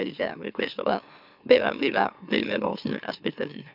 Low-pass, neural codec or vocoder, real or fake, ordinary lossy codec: 5.4 kHz; autoencoder, 44.1 kHz, a latent of 192 numbers a frame, MeloTTS; fake; none